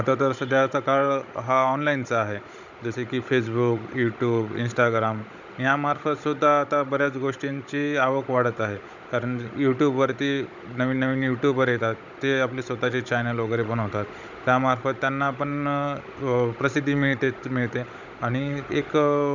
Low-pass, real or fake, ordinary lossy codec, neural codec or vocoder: 7.2 kHz; fake; none; codec, 16 kHz, 16 kbps, FunCodec, trained on Chinese and English, 50 frames a second